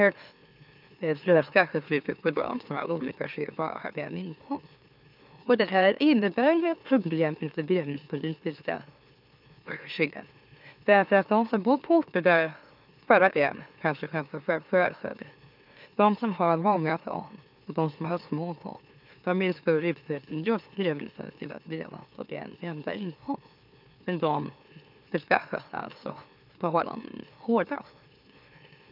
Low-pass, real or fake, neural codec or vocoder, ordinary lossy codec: 5.4 kHz; fake; autoencoder, 44.1 kHz, a latent of 192 numbers a frame, MeloTTS; none